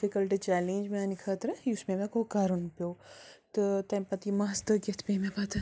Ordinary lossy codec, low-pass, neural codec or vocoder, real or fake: none; none; none; real